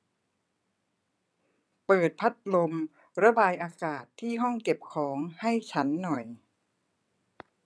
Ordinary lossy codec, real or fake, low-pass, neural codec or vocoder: none; fake; none; vocoder, 22.05 kHz, 80 mel bands, WaveNeXt